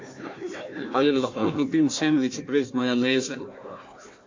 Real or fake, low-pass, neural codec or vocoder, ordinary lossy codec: fake; 7.2 kHz; codec, 16 kHz, 1 kbps, FunCodec, trained on Chinese and English, 50 frames a second; MP3, 48 kbps